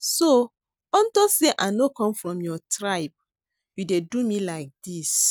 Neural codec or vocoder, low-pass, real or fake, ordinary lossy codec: none; none; real; none